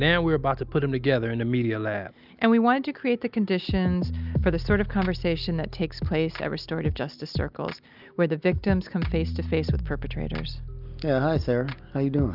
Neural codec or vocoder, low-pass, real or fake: none; 5.4 kHz; real